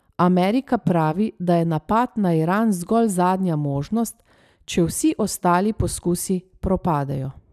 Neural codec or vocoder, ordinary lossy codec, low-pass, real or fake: none; none; 14.4 kHz; real